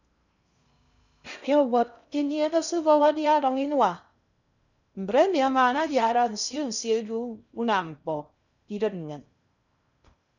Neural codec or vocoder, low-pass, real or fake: codec, 16 kHz in and 24 kHz out, 0.6 kbps, FocalCodec, streaming, 2048 codes; 7.2 kHz; fake